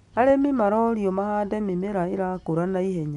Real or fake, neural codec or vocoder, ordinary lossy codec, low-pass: real; none; none; 10.8 kHz